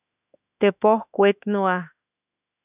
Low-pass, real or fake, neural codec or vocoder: 3.6 kHz; fake; autoencoder, 48 kHz, 32 numbers a frame, DAC-VAE, trained on Japanese speech